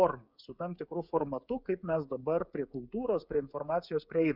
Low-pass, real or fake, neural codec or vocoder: 5.4 kHz; fake; codec, 16 kHz, 8 kbps, FreqCodec, smaller model